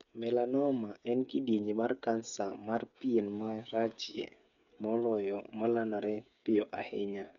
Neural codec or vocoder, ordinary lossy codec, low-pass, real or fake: codec, 16 kHz, 8 kbps, FreqCodec, smaller model; none; 7.2 kHz; fake